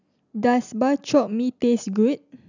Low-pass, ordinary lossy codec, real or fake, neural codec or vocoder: 7.2 kHz; none; real; none